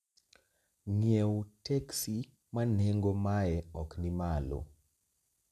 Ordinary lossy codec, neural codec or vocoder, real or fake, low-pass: none; none; real; 14.4 kHz